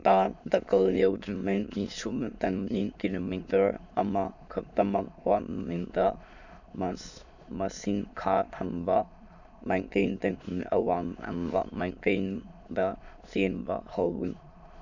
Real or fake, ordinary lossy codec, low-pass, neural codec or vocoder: fake; none; 7.2 kHz; autoencoder, 22.05 kHz, a latent of 192 numbers a frame, VITS, trained on many speakers